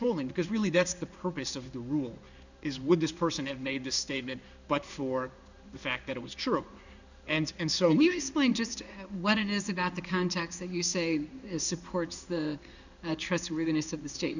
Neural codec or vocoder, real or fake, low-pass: codec, 16 kHz in and 24 kHz out, 1 kbps, XY-Tokenizer; fake; 7.2 kHz